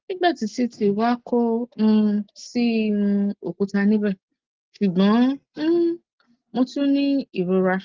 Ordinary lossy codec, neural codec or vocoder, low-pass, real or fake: Opus, 16 kbps; none; 7.2 kHz; real